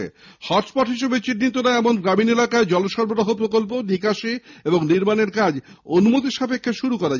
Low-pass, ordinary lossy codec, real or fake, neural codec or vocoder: 7.2 kHz; none; real; none